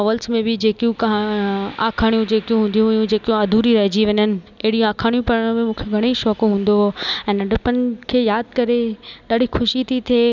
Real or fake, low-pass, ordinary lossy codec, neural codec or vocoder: real; 7.2 kHz; none; none